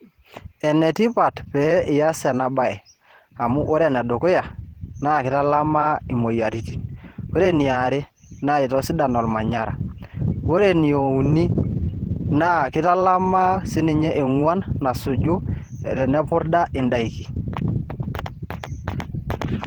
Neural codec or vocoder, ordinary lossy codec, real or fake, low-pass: vocoder, 44.1 kHz, 128 mel bands every 512 samples, BigVGAN v2; Opus, 16 kbps; fake; 19.8 kHz